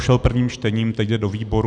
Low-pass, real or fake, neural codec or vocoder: 9.9 kHz; real; none